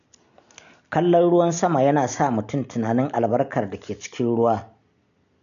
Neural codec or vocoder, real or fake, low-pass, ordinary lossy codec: none; real; 7.2 kHz; none